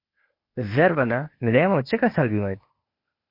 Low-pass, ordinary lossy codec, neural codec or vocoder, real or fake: 5.4 kHz; MP3, 32 kbps; codec, 16 kHz, 0.8 kbps, ZipCodec; fake